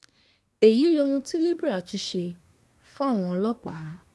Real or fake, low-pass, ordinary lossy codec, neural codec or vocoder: fake; none; none; codec, 24 kHz, 1 kbps, SNAC